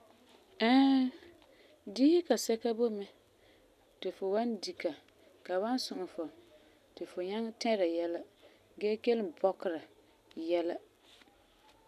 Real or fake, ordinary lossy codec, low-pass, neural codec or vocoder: real; none; 14.4 kHz; none